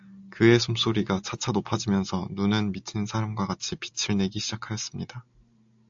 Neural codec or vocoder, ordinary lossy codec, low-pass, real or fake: none; AAC, 64 kbps; 7.2 kHz; real